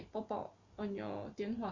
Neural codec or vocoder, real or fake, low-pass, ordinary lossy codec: none; real; 7.2 kHz; Opus, 64 kbps